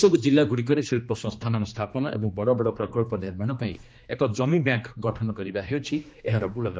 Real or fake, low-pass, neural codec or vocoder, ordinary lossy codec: fake; none; codec, 16 kHz, 2 kbps, X-Codec, HuBERT features, trained on general audio; none